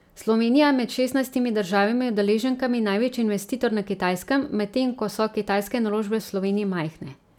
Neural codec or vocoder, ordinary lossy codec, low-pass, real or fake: none; none; 19.8 kHz; real